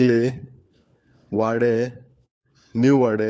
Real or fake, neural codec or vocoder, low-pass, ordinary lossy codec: fake; codec, 16 kHz, 4 kbps, FunCodec, trained on LibriTTS, 50 frames a second; none; none